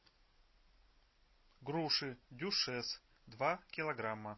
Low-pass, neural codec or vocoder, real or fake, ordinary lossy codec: 7.2 kHz; none; real; MP3, 24 kbps